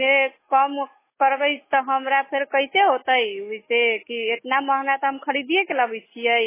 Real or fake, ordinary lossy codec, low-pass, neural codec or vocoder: fake; MP3, 16 kbps; 3.6 kHz; autoencoder, 48 kHz, 128 numbers a frame, DAC-VAE, trained on Japanese speech